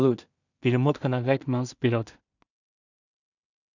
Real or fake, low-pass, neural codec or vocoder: fake; 7.2 kHz; codec, 16 kHz in and 24 kHz out, 0.4 kbps, LongCat-Audio-Codec, two codebook decoder